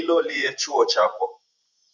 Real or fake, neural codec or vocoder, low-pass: fake; vocoder, 24 kHz, 100 mel bands, Vocos; 7.2 kHz